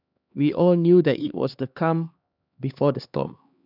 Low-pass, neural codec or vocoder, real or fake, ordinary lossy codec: 5.4 kHz; codec, 16 kHz, 2 kbps, X-Codec, HuBERT features, trained on LibriSpeech; fake; none